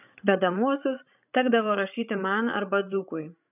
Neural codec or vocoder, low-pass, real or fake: codec, 16 kHz, 16 kbps, FreqCodec, larger model; 3.6 kHz; fake